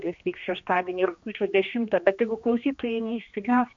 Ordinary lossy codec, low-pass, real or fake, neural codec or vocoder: MP3, 48 kbps; 7.2 kHz; fake; codec, 16 kHz, 2 kbps, X-Codec, HuBERT features, trained on general audio